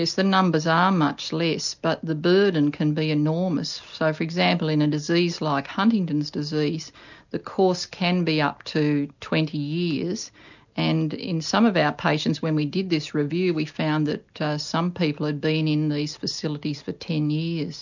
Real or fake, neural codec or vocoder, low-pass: real; none; 7.2 kHz